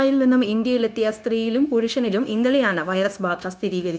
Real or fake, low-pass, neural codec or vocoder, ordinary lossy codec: fake; none; codec, 16 kHz, 0.9 kbps, LongCat-Audio-Codec; none